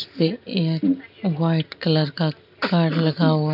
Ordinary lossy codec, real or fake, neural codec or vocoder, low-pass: none; real; none; 5.4 kHz